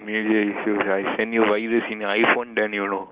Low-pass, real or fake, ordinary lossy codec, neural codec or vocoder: 3.6 kHz; real; Opus, 24 kbps; none